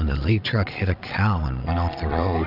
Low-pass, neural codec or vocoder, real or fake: 5.4 kHz; vocoder, 22.05 kHz, 80 mel bands, Vocos; fake